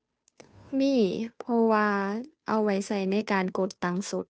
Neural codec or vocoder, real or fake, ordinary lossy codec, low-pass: codec, 16 kHz, 2 kbps, FunCodec, trained on Chinese and English, 25 frames a second; fake; none; none